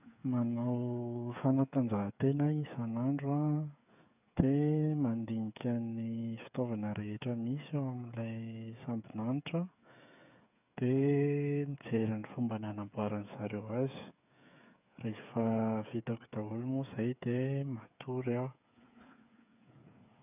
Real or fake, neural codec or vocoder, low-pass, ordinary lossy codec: fake; codec, 16 kHz, 8 kbps, FreqCodec, smaller model; 3.6 kHz; none